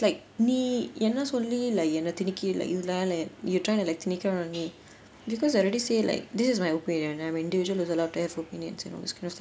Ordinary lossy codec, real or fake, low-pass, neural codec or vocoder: none; real; none; none